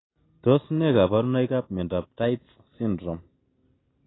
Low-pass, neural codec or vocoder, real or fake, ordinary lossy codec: 7.2 kHz; none; real; AAC, 16 kbps